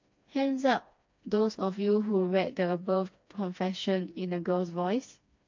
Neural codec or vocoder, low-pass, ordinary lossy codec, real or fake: codec, 16 kHz, 2 kbps, FreqCodec, smaller model; 7.2 kHz; MP3, 48 kbps; fake